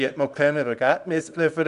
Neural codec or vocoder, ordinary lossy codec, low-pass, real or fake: codec, 24 kHz, 0.9 kbps, WavTokenizer, small release; none; 10.8 kHz; fake